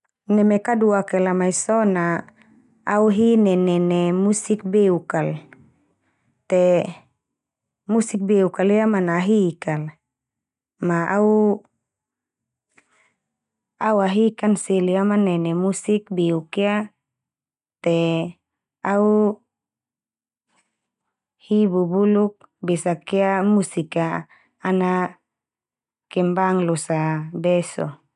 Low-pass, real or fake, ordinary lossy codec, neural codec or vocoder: 10.8 kHz; real; none; none